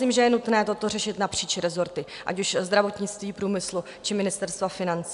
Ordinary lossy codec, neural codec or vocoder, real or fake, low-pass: MP3, 96 kbps; none; real; 10.8 kHz